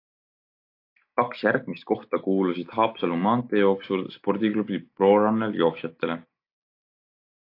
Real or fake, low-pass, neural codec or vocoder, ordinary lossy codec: real; 5.4 kHz; none; AAC, 32 kbps